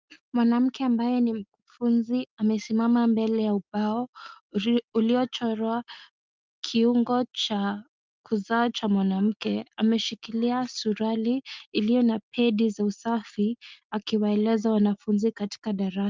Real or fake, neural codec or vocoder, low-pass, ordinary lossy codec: real; none; 7.2 kHz; Opus, 24 kbps